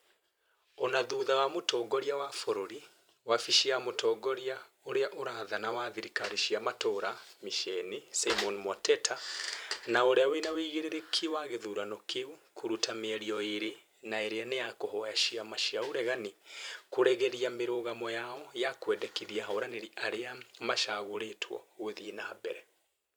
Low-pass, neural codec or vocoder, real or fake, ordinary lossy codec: none; vocoder, 44.1 kHz, 128 mel bands every 512 samples, BigVGAN v2; fake; none